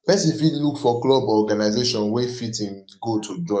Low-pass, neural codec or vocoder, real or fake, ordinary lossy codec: 9.9 kHz; codec, 44.1 kHz, 7.8 kbps, DAC; fake; none